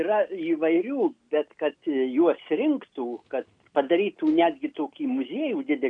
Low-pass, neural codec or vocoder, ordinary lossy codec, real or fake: 9.9 kHz; none; MP3, 64 kbps; real